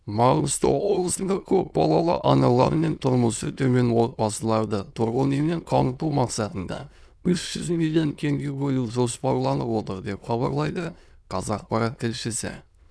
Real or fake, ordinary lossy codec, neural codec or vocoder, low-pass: fake; none; autoencoder, 22.05 kHz, a latent of 192 numbers a frame, VITS, trained on many speakers; none